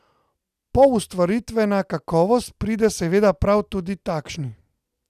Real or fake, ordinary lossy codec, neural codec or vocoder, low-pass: real; none; none; 14.4 kHz